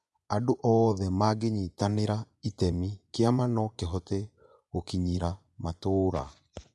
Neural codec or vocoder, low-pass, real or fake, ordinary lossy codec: vocoder, 24 kHz, 100 mel bands, Vocos; none; fake; none